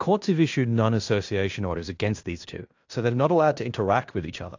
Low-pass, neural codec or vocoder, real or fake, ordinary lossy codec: 7.2 kHz; codec, 16 kHz in and 24 kHz out, 0.9 kbps, LongCat-Audio-Codec, fine tuned four codebook decoder; fake; AAC, 48 kbps